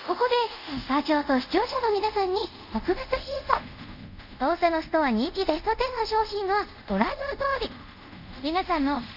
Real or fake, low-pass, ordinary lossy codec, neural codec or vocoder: fake; 5.4 kHz; none; codec, 24 kHz, 0.5 kbps, DualCodec